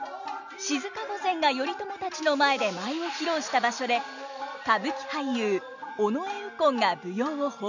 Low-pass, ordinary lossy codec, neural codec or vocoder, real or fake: 7.2 kHz; none; none; real